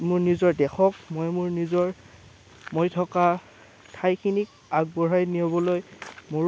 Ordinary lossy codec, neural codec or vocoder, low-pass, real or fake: none; none; none; real